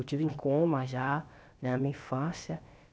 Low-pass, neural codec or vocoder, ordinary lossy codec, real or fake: none; codec, 16 kHz, about 1 kbps, DyCAST, with the encoder's durations; none; fake